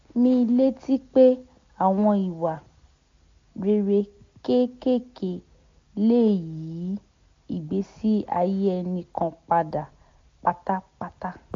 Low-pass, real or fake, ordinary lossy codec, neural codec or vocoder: 7.2 kHz; real; AAC, 48 kbps; none